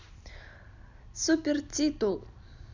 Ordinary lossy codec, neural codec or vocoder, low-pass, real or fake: none; none; 7.2 kHz; real